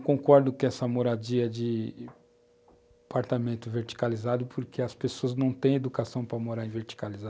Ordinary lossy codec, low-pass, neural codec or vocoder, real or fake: none; none; none; real